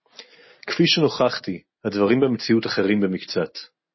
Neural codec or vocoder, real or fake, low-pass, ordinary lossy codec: none; real; 7.2 kHz; MP3, 24 kbps